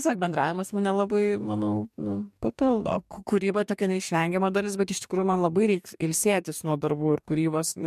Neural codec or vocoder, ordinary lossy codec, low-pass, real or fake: codec, 44.1 kHz, 2.6 kbps, DAC; MP3, 96 kbps; 14.4 kHz; fake